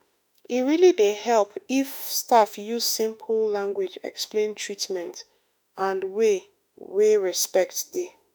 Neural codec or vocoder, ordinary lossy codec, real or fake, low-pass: autoencoder, 48 kHz, 32 numbers a frame, DAC-VAE, trained on Japanese speech; none; fake; none